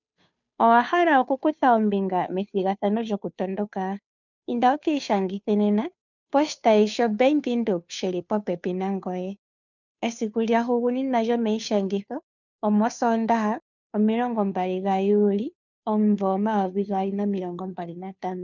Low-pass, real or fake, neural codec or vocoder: 7.2 kHz; fake; codec, 16 kHz, 2 kbps, FunCodec, trained on Chinese and English, 25 frames a second